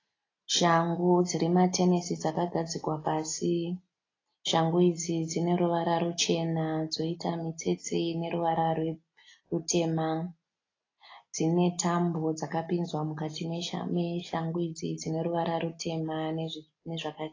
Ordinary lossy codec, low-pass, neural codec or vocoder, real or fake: AAC, 32 kbps; 7.2 kHz; none; real